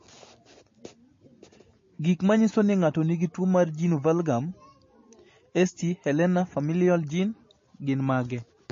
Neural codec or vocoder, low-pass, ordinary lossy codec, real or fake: none; 7.2 kHz; MP3, 32 kbps; real